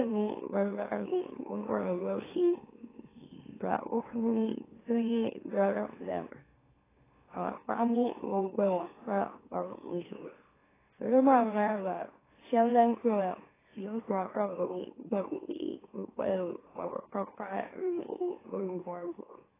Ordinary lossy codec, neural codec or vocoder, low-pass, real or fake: AAC, 16 kbps; autoencoder, 44.1 kHz, a latent of 192 numbers a frame, MeloTTS; 3.6 kHz; fake